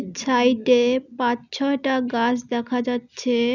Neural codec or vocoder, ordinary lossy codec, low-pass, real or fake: none; none; 7.2 kHz; real